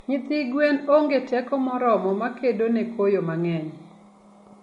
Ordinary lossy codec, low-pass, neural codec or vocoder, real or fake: MP3, 48 kbps; 10.8 kHz; none; real